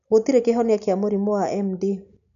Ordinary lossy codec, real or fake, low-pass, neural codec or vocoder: none; real; 7.2 kHz; none